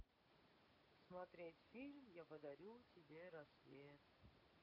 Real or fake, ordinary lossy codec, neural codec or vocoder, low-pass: real; none; none; 5.4 kHz